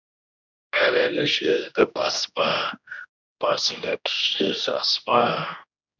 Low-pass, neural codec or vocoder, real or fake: 7.2 kHz; codec, 16 kHz, 1 kbps, X-Codec, HuBERT features, trained on general audio; fake